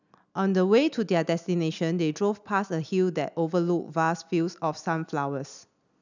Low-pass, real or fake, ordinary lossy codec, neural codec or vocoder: 7.2 kHz; real; none; none